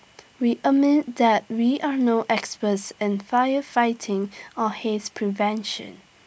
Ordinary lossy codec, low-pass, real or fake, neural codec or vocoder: none; none; real; none